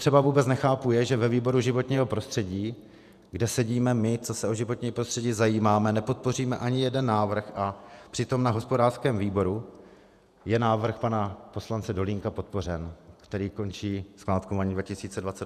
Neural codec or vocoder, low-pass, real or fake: vocoder, 48 kHz, 128 mel bands, Vocos; 14.4 kHz; fake